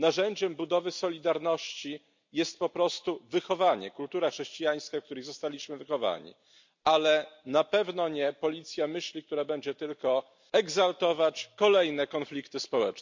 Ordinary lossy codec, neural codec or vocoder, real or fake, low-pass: MP3, 64 kbps; none; real; 7.2 kHz